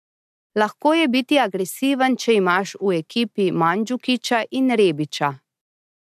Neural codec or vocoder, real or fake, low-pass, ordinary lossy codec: none; real; 14.4 kHz; AAC, 96 kbps